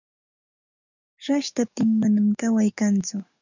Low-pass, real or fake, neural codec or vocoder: 7.2 kHz; fake; codec, 44.1 kHz, 7.8 kbps, DAC